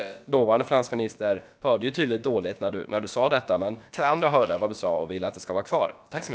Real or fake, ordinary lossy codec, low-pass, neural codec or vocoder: fake; none; none; codec, 16 kHz, about 1 kbps, DyCAST, with the encoder's durations